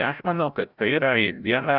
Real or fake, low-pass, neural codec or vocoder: fake; 5.4 kHz; codec, 16 kHz, 0.5 kbps, FreqCodec, larger model